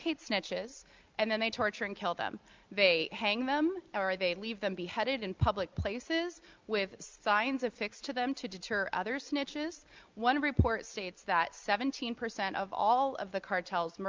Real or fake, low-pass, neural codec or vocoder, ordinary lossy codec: real; 7.2 kHz; none; Opus, 24 kbps